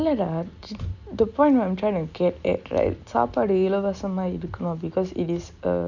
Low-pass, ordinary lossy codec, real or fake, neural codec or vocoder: 7.2 kHz; none; real; none